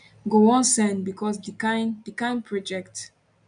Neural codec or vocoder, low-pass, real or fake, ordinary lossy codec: none; 9.9 kHz; real; none